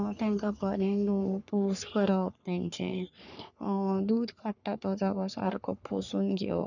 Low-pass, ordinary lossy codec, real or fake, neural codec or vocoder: 7.2 kHz; none; fake; codec, 44.1 kHz, 3.4 kbps, Pupu-Codec